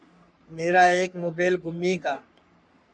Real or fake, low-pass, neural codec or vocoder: fake; 9.9 kHz; codec, 44.1 kHz, 3.4 kbps, Pupu-Codec